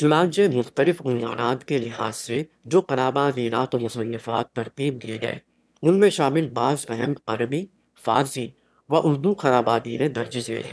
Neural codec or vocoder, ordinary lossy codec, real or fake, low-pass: autoencoder, 22.05 kHz, a latent of 192 numbers a frame, VITS, trained on one speaker; none; fake; none